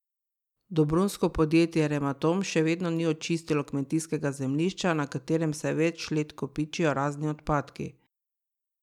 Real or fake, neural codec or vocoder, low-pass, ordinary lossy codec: real; none; 19.8 kHz; none